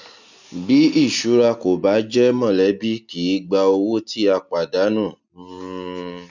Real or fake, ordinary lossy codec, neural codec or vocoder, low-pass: real; none; none; 7.2 kHz